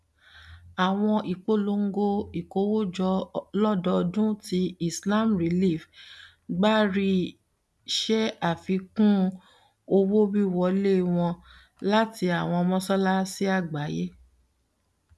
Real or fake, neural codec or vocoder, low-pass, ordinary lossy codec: real; none; none; none